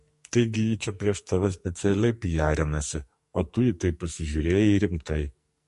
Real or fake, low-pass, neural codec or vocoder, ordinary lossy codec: fake; 14.4 kHz; codec, 44.1 kHz, 2.6 kbps, SNAC; MP3, 48 kbps